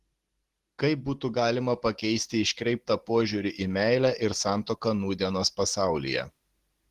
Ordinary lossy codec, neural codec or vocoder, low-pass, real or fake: Opus, 16 kbps; none; 14.4 kHz; real